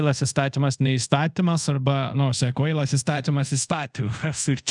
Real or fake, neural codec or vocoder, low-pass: fake; codec, 24 kHz, 0.5 kbps, DualCodec; 10.8 kHz